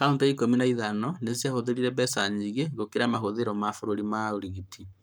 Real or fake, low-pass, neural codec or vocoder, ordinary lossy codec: fake; none; codec, 44.1 kHz, 7.8 kbps, Pupu-Codec; none